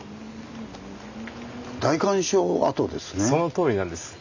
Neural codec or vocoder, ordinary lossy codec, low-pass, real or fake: none; none; 7.2 kHz; real